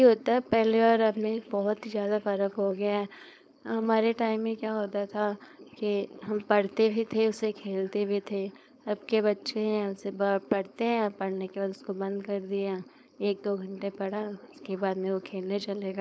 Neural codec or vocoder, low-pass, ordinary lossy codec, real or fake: codec, 16 kHz, 4.8 kbps, FACodec; none; none; fake